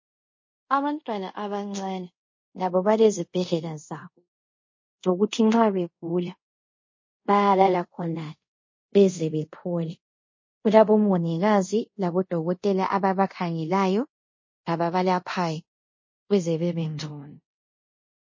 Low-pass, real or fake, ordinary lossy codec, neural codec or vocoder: 7.2 kHz; fake; MP3, 32 kbps; codec, 24 kHz, 0.5 kbps, DualCodec